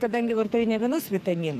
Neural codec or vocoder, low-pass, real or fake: codec, 44.1 kHz, 2.6 kbps, SNAC; 14.4 kHz; fake